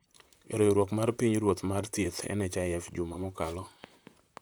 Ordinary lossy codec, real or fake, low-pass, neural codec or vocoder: none; fake; none; vocoder, 44.1 kHz, 128 mel bands, Pupu-Vocoder